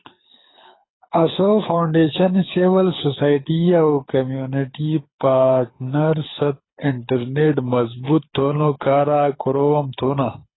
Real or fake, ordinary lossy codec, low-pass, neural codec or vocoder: fake; AAC, 16 kbps; 7.2 kHz; codec, 24 kHz, 6 kbps, HILCodec